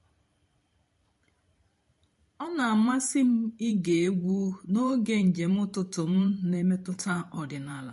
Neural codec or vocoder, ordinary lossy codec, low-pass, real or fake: vocoder, 44.1 kHz, 128 mel bands every 512 samples, BigVGAN v2; MP3, 48 kbps; 14.4 kHz; fake